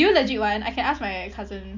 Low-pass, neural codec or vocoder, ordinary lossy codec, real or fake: 7.2 kHz; none; MP3, 64 kbps; real